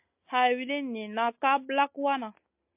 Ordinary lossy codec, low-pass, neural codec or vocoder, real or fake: MP3, 32 kbps; 3.6 kHz; none; real